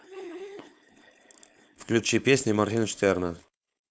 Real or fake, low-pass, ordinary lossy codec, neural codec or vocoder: fake; none; none; codec, 16 kHz, 4.8 kbps, FACodec